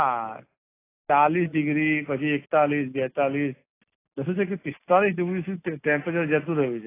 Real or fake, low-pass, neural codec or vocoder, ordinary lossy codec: real; 3.6 kHz; none; AAC, 24 kbps